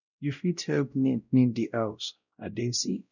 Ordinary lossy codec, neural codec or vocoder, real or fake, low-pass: none; codec, 16 kHz, 0.5 kbps, X-Codec, WavLM features, trained on Multilingual LibriSpeech; fake; 7.2 kHz